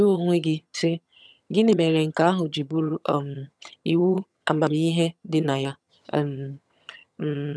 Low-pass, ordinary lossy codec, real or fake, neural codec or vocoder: none; none; fake; vocoder, 22.05 kHz, 80 mel bands, HiFi-GAN